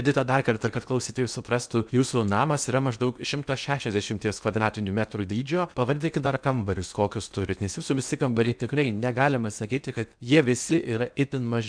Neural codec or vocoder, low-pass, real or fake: codec, 16 kHz in and 24 kHz out, 0.8 kbps, FocalCodec, streaming, 65536 codes; 9.9 kHz; fake